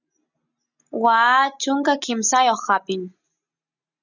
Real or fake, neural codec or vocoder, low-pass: real; none; 7.2 kHz